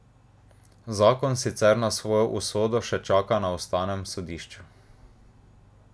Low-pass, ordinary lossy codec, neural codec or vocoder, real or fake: none; none; none; real